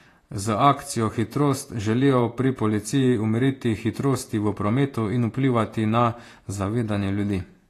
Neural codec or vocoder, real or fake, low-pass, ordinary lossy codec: vocoder, 48 kHz, 128 mel bands, Vocos; fake; 14.4 kHz; AAC, 48 kbps